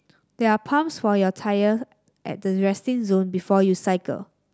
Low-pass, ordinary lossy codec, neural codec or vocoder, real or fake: none; none; none; real